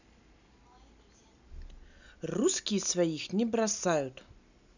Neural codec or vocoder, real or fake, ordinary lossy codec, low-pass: none; real; none; 7.2 kHz